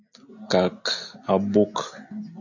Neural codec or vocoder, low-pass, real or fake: none; 7.2 kHz; real